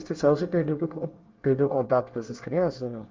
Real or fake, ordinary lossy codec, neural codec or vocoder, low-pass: fake; Opus, 32 kbps; codec, 24 kHz, 1 kbps, SNAC; 7.2 kHz